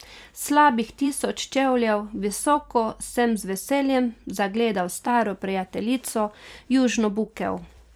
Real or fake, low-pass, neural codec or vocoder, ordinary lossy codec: fake; 19.8 kHz; vocoder, 44.1 kHz, 128 mel bands every 256 samples, BigVGAN v2; none